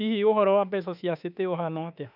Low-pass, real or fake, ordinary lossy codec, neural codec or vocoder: 5.4 kHz; fake; none; autoencoder, 48 kHz, 32 numbers a frame, DAC-VAE, trained on Japanese speech